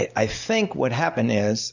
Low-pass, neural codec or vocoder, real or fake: 7.2 kHz; none; real